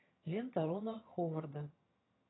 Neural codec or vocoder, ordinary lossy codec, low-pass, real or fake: vocoder, 22.05 kHz, 80 mel bands, HiFi-GAN; AAC, 16 kbps; 7.2 kHz; fake